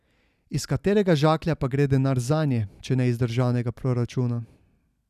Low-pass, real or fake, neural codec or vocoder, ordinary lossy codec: 14.4 kHz; real; none; none